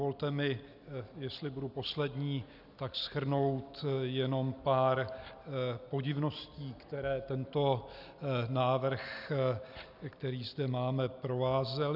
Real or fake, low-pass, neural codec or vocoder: real; 5.4 kHz; none